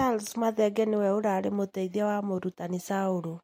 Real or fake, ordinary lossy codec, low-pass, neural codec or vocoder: real; MP3, 64 kbps; 19.8 kHz; none